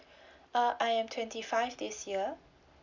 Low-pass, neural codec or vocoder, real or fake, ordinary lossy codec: 7.2 kHz; none; real; none